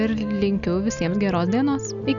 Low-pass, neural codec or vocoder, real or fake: 7.2 kHz; none; real